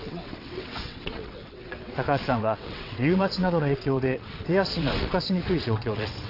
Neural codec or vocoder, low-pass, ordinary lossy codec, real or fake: vocoder, 22.05 kHz, 80 mel bands, WaveNeXt; 5.4 kHz; AAC, 32 kbps; fake